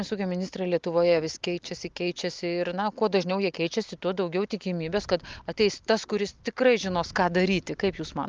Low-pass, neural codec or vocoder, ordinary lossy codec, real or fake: 7.2 kHz; none; Opus, 32 kbps; real